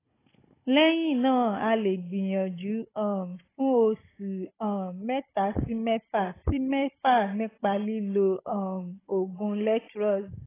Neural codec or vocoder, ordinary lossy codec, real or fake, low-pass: codec, 16 kHz, 16 kbps, FunCodec, trained on Chinese and English, 50 frames a second; AAC, 16 kbps; fake; 3.6 kHz